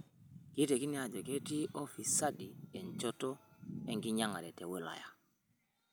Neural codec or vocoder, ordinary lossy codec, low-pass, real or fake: none; none; none; real